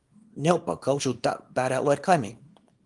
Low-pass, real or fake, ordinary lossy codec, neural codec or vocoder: 10.8 kHz; fake; Opus, 32 kbps; codec, 24 kHz, 0.9 kbps, WavTokenizer, small release